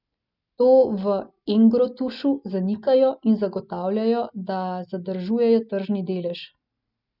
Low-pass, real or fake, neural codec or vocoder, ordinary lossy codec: 5.4 kHz; real; none; none